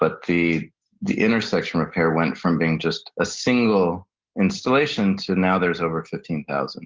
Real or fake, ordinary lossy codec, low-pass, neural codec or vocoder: real; Opus, 16 kbps; 7.2 kHz; none